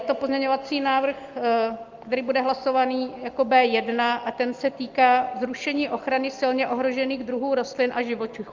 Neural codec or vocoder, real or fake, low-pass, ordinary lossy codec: none; real; 7.2 kHz; Opus, 32 kbps